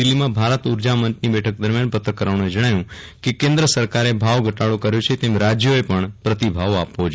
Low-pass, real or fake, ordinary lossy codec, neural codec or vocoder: none; real; none; none